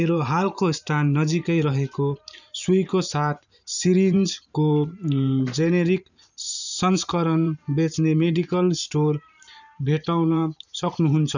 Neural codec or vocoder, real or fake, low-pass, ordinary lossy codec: vocoder, 44.1 kHz, 128 mel bands every 512 samples, BigVGAN v2; fake; 7.2 kHz; none